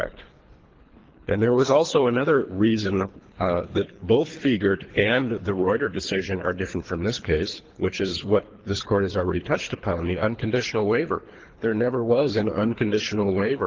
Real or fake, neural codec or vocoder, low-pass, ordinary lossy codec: fake; codec, 24 kHz, 3 kbps, HILCodec; 7.2 kHz; Opus, 16 kbps